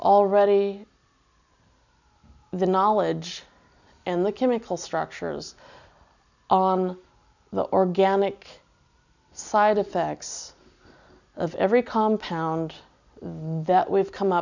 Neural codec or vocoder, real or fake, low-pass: none; real; 7.2 kHz